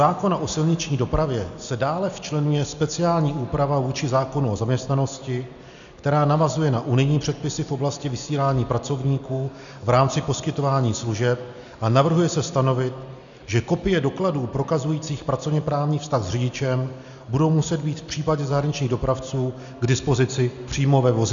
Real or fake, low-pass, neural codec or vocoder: real; 7.2 kHz; none